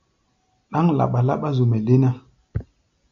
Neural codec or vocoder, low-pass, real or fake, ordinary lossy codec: none; 7.2 kHz; real; AAC, 64 kbps